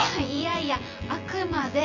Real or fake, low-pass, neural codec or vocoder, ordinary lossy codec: fake; 7.2 kHz; vocoder, 24 kHz, 100 mel bands, Vocos; none